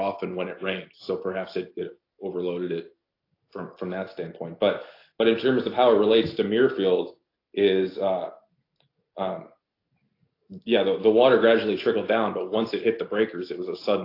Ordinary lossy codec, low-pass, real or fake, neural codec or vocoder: AAC, 32 kbps; 5.4 kHz; real; none